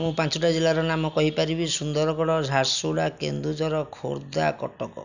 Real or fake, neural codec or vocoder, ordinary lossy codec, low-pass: real; none; none; 7.2 kHz